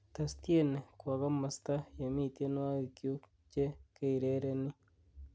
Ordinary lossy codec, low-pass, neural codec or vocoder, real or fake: none; none; none; real